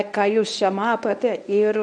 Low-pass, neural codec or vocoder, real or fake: 9.9 kHz; codec, 24 kHz, 0.9 kbps, WavTokenizer, medium speech release version 1; fake